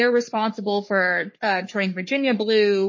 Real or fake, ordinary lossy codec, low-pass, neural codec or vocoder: fake; MP3, 32 kbps; 7.2 kHz; codec, 44.1 kHz, 3.4 kbps, Pupu-Codec